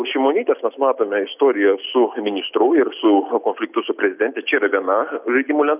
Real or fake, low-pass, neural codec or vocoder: real; 3.6 kHz; none